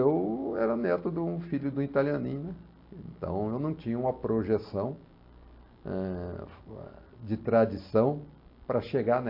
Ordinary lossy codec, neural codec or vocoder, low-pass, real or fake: MP3, 32 kbps; none; 5.4 kHz; real